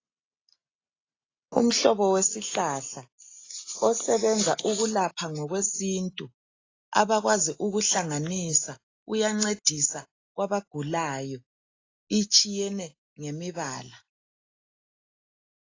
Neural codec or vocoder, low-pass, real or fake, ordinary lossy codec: none; 7.2 kHz; real; AAC, 32 kbps